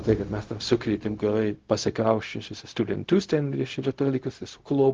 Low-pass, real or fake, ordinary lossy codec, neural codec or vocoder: 7.2 kHz; fake; Opus, 16 kbps; codec, 16 kHz, 0.4 kbps, LongCat-Audio-Codec